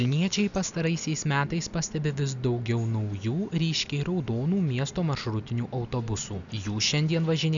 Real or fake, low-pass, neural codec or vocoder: real; 7.2 kHz; none